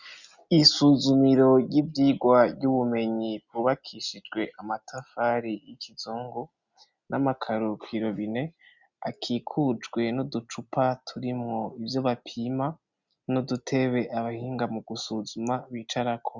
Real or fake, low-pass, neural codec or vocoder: real; 7.2 kHz; none